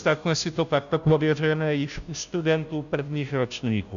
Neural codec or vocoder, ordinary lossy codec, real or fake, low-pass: codec, 16 kHz, 0.5 kbps, FunCodec, trained on Chinese and English, 25 frames a second; MP3, 96 kbps; fake; 7.2 kHz